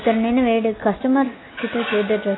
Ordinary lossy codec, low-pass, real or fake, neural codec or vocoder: AAC, 16 kbps; 7.2 kHz; real; none